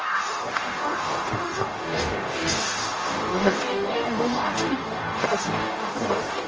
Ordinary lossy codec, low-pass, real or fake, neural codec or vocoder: Opus, 24 kbps; 7.2 kHz; fake; codec, 44.1 kHz, 0.9 kbps, DAC